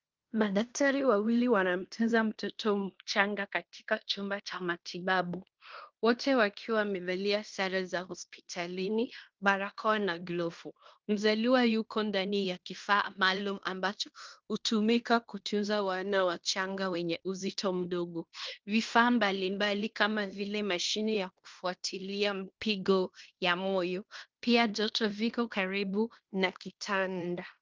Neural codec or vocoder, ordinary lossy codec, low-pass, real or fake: codec, 16 kHz in and 24 kHz out, 0.9 kbps, LongCat-Audio-Codec, fine tuned four codebook decoder; Opus, 32 kbps; 7.2 kHz; fake